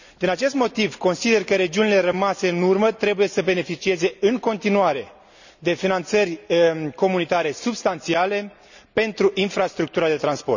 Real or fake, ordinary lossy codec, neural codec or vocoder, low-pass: real; none; none; 7.2 kHz